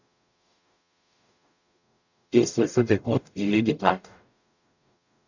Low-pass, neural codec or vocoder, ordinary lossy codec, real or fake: 7.2 kHz; codec, 44.1 kHz, 0.9 kbps, DAC; none; fake